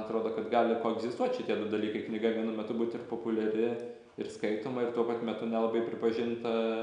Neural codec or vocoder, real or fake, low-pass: none; real; 9.9 kHz